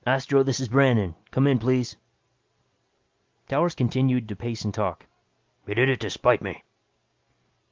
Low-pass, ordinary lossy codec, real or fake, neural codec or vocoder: 7.2 kHz; Opus, 32 kbps; real; none